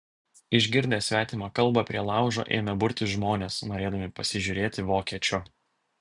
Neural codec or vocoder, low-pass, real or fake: none; 10.8 kHz; real